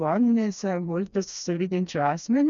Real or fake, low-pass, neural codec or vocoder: fake; 7.2 kHz; codec, 16 kHz, 2 kbps, FreqCodec, smaller model